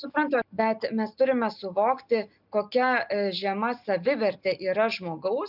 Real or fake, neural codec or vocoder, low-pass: real; none; 5.4 kHz